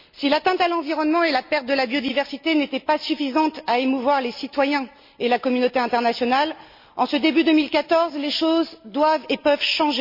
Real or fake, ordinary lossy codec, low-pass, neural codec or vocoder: real; MP3, 32 kbps; 5.4 kHz; none